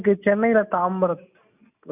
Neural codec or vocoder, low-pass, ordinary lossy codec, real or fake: none; 3.6 kHz; none; real